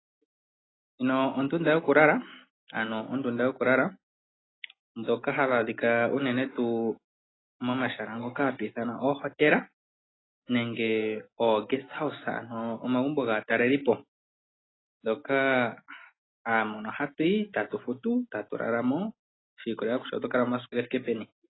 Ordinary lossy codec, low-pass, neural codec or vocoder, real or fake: AAC, 16 kbps; 7.2 kHz; none; real